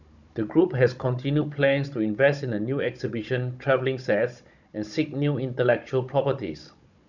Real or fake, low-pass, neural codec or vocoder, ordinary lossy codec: fake; 7.2 kHz; codec, 16 kHz, 16 kbps, FunCodec, trained on Chinese and English, 50 frames a second; none